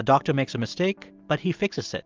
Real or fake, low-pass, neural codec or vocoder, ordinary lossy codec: real; 7.2 kHz; none; Opus, 32 kbps